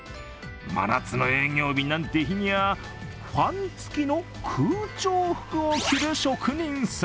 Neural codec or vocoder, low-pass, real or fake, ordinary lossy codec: none; none; real; none